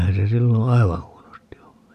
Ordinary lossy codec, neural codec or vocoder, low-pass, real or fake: none; none; 14.4 kHz; real